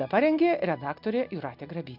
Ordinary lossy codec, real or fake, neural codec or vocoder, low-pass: MP3, 48 kbps; real; none; 5.4 kHz